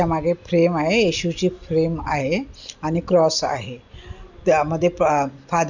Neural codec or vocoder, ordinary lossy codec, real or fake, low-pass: none; none; real; 7.2 kHz